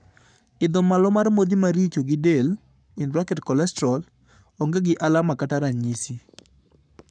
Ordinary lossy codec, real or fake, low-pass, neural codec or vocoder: none; fake; 9.9 kHz; codec, 44.1 kHz, 7.8 kbps, Pupu-Codec